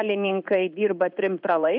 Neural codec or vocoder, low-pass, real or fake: codec, 16 kHz in and 24 kHz out, 1 kbps, XY-Tokenizer; 5.4 kHz; fake